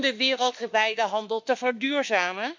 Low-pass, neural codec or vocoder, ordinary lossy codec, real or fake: 7.2 kHz; autoencoder, 48 kHz, 32 numbers a frame, DAC-VAE, trained on Japanese speech; MP3, 64 kbps; fake